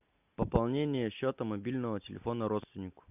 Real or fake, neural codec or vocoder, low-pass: real; none; 3.6 kHz